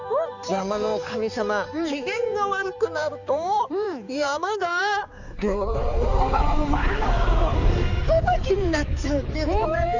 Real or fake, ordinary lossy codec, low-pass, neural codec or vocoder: fake; none; 7.2 kHz; codec, 16 kHz, 4 kbps, X-Codec, HuBERT features, trained on balanced general audio